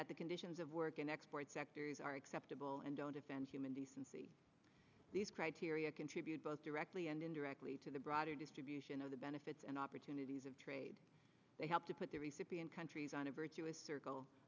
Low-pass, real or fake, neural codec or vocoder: 7.2 kHz; real; none